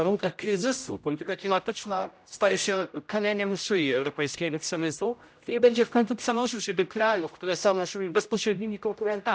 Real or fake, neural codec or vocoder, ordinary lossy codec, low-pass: fake; codec, 16 kHz, 0.5 kbps, X-Codec, HuBERT features, trained on general audio; none; none